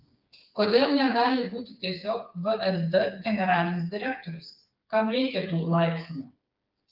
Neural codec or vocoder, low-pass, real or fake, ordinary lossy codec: codec, 16 kHz, 4 kbps, FreqCodec, smaller model; 5.4 kHz; fake; Opus, 24 kbps